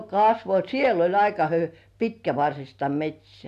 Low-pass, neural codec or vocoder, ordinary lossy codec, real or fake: 14.4 kHz; none; none; real